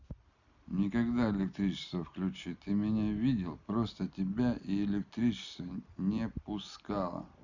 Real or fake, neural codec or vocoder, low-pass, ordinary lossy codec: real; none; 7.2 kHz; none